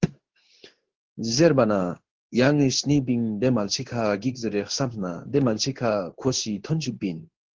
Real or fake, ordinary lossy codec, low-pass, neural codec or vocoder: fake; Opus, 16 kbps; 7.2 kHz; codec, 16 kHz in and 24 kHz out, 1 kbps, XY-Tokenizer